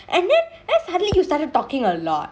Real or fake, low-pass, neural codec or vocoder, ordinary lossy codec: real; none; none; none